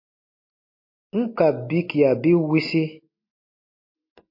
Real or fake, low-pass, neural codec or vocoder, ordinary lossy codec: real; 5.4 kHz; none; MP3, 32 kbps